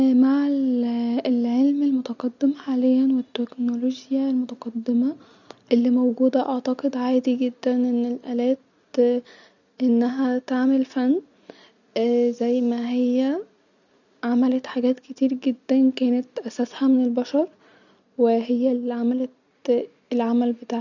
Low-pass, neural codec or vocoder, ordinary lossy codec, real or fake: 7.2 kHz; none; none; real